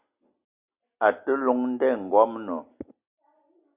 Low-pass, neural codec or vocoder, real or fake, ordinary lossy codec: 3.6 kHz; none; real; Opus, 64 kbps